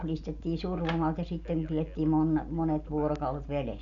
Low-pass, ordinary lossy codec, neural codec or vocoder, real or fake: 7.2 kHz; none; none; real